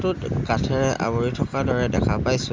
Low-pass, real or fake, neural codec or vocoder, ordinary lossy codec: 7.2 kHz; real; none; Opus, 32 kbps